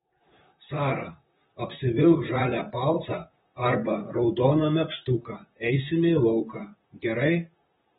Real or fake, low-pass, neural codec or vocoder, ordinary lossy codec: fake; 19.8 kHz; vocoder, 44.1 kHz, 128 mel bands, Pupu-Vocoder; AAC, 16 kbps